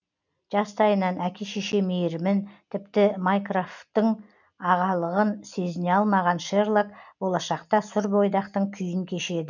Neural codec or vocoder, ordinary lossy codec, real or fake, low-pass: none; none; real; 7.2 kHz